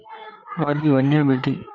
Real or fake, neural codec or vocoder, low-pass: fake; codec, 16 kHz, 4 kbps, FreqCodec, larger model; 7.2 kHz